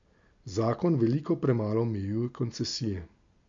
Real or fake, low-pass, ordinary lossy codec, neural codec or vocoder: real; 7.2 kHz; MP3, 48 kbps; none